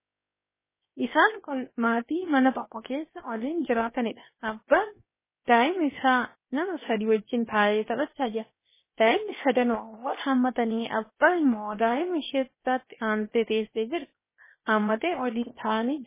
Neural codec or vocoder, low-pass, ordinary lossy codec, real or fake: codec, 16 kHz, 0.7 kbps, FocalCodec; 3.6 kHz; MP3, 16 kbps; fake